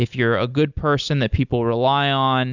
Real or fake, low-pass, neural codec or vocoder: real; 7.2 kHz; none